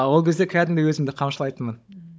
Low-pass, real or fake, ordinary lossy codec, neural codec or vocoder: none; fake; none; codec, 16 kHz, 16 kbps, FunCodec, trained on Chinese and English, 50 frames a second